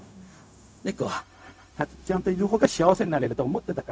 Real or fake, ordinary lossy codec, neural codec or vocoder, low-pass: fake; none; codec, 16 kHz, 0.4 kbps, LongCat-Audio-Codec; none